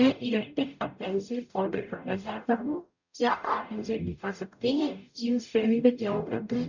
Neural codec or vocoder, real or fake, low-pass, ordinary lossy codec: codec, 44.1 kHz, 0.9 kbps, DAC; fake; 7.2 kHz; none